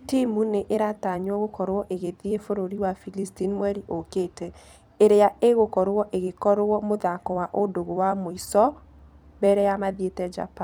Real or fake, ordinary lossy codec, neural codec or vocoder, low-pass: fake; none; vocoder, 44.1 kHz, 128 mel bands every 256 samples, BigVGAN v2; 19.8 kHz